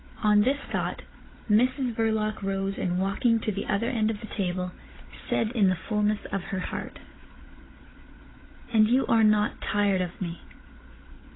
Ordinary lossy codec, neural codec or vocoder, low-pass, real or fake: AAC, 16 kbps; codec, 16 kHz, 16 kbps, FunCodec, trained on Chinese and English, 50 frames a second; 7.2 kHz; fake